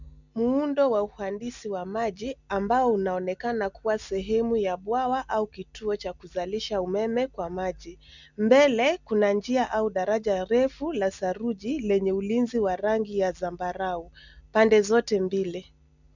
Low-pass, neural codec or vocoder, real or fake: 7.2 kHz; none; real